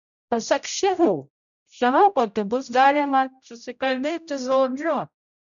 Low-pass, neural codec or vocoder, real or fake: 7.2 kHz; codec, 16 kHz, 0.5 kbps, X-Codec, HuBERT features, trained on general audio; fake